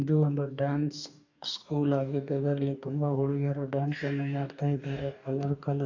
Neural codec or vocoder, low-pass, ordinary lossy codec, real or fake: codec, 44.1 kHz, 2.6 kbps, DAC; 7.2 kHz; none; fake